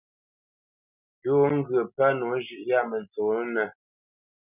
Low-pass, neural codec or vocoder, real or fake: 3.6 kHz; none; real